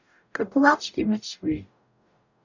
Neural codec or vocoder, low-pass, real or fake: codec, 44.1 kHz, 0.9 kbps, DAC; 7.2 kHz; fake